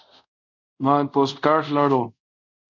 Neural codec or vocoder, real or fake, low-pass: codec, 24 kHz, 0.5 kbps, DualCodec; fake; 7.2 kHz